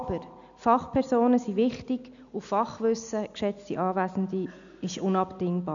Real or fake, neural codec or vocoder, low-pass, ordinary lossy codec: real; none; 7.2 kHz; none